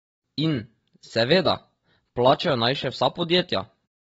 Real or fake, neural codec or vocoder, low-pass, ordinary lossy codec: real; none; 9.9 kHz; AAC, 24 kbps